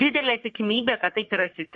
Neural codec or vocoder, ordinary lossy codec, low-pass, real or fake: codec, 44.1 kHz, 3.4 kbps, Pupu-Codec; MP3, 48 kbps; 10.8 kHz; fake